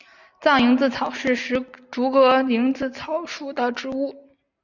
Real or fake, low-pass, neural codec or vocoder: real; 7.2 kHz; none